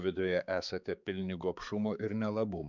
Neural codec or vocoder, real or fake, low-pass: codec, 16 kHz, 4 kbps, X-Codec, HuBERT features, trained on balanced general audio; fake; 7.2 kHz